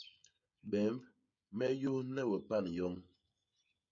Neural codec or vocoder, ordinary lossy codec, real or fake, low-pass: codec, 16 kHz, 16 kbps, FreqCodec, smaller model; MP3, 96 kbps; fake; 7.2 kHz